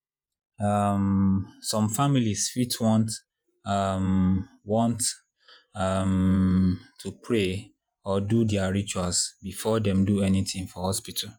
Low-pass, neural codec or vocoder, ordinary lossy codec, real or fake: none; vocoder, 48 kHz, 128 mel bands, Vocos; none; fake